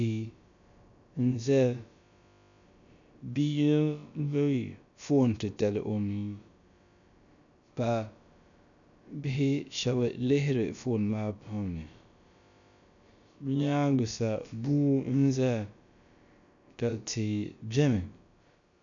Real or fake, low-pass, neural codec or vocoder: fake; 7.2 kHz; codec, 16 kHz, about 1 kbps, DyCAST, with the encoder's durations